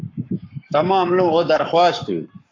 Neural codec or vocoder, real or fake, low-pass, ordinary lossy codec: codec, 44.1 kHz, 7.8 kbps, Pupu-Codec; fake; 7.2 kHz; AAC, 48 kbps